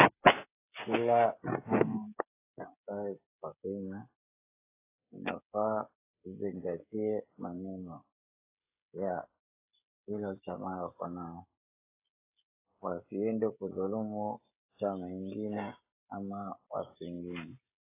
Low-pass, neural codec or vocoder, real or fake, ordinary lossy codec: 3.6 kHz; codec, 16 kHz, 16 kbps, FreqCodec, smaller model; fake; AAC, 24 kbps